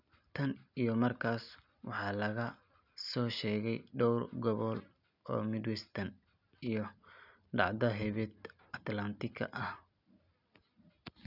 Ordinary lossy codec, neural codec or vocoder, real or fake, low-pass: none; none; real; 5.4 kHz